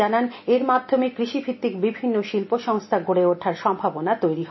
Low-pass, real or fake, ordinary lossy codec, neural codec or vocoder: 7.2 kHz; real; MP3, 24 kbps; none